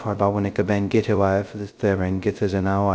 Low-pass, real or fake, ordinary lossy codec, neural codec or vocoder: none; fake; none; codec, 16 kHz, 0.2 kbps, FocalCodec